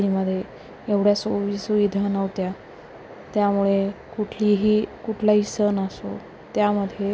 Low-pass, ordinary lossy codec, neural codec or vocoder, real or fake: none; none; none; real